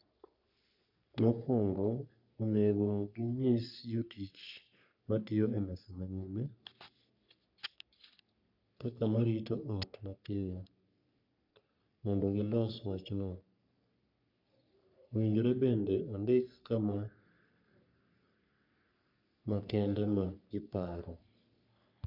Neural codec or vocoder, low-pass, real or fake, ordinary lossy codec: codec, 44.1 kHz, 3.4 kbps, Pupu-Codec; 5.4 kHz; fake; none